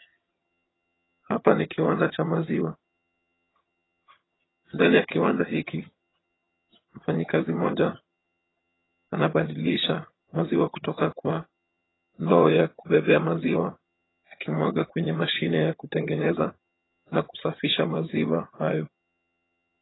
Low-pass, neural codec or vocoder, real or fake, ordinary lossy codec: 7.2 kHz; vocoder, 22.05 kHz, 80 mel bands, HiFi-GAN; fake; AAC, 16 kbps